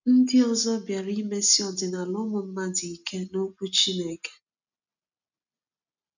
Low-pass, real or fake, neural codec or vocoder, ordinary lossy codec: 7.2 kHz; real; none; none